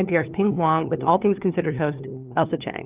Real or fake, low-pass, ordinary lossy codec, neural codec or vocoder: fake; 3.6 kHz; Opus, 32 kbps; codec, 16 kHz, 2 kbps, FunCodec, trained on LibriTTS, 25 frames a second